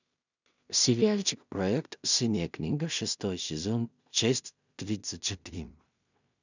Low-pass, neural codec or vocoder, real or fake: 7.2 kHz; codec, 16 kHz in and 24 kHz out, 0.4 kbps, LongCat-Audio-Codec, two codebook decoder; fake